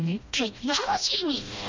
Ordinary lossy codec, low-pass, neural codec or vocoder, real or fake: MP3, 64 kbps; 7.2 kHz; codec, 16 kHz, 1 kbps, FreqCodec, smaller model; fake